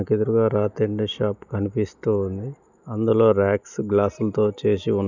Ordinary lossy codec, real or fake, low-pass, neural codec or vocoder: none; real; 7.2 kHz; none